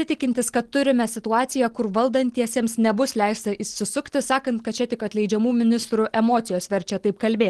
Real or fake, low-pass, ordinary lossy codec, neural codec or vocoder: real; 10.8 kHz; Opus, 16 kbps; none